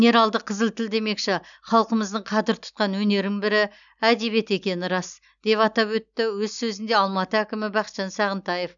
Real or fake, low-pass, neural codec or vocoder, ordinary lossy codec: real; 7.2 kHz; none; none